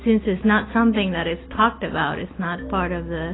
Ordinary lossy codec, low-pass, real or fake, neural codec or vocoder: AAC, 16 kbps; 7.2 kHz; real; none